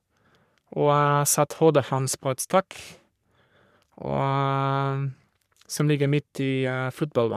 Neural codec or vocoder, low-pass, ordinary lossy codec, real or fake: codec, 44.1 kHz, 3.4 kbps, Pupu-Codec; 14.4 kHz; none; fake